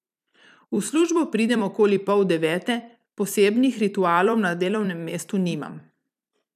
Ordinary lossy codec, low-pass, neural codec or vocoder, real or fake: none; 14.4 kHz; vocoder, 44.1 kHz, 128 mel bands every 256 samples, BigVGAN v2; fake